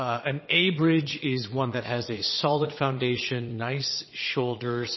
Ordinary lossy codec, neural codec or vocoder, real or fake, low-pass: MP3, 24 kbps; vocoder, 22.05 kHz, 80 mel bands, Vocos; fake; 7.2 kHz